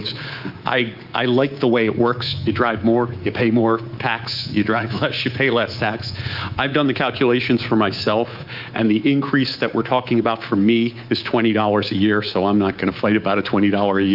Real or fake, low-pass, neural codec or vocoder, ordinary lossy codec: fake; 5.4 kHz; codec, 24 kHz, 3.1 kbps, DualCodec; Opus, 24 kbps